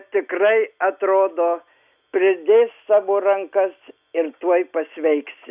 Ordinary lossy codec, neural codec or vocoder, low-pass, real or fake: Opus, 64 kbps; none; 3.6 kHz; real